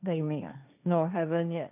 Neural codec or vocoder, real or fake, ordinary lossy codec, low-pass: codec, 16 kHz, 1.1 kbps, Voila-Tokenizer; fake; none; 3.6 kHz